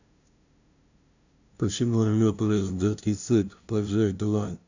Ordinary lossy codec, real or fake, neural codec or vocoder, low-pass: none; fake; codec, 16 kHz, 0.5 kbps, FunCodec, trained on LibriTTS, 25 frames a second; 7.2 kHz